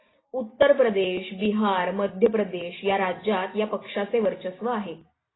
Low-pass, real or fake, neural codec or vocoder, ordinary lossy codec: 7.2 kHz; real; none; AAC, 16 kbps